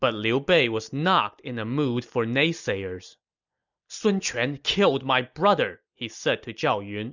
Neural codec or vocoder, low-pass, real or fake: none; 7.2 kHz; real